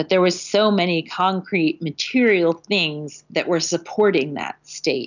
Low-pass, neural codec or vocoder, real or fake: 7.2 kHz; none; real